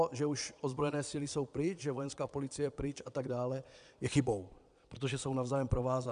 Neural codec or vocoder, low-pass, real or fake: vocoder, 22.05 kHz, 80 mel bands, Vocos; 9.9 kHz; fake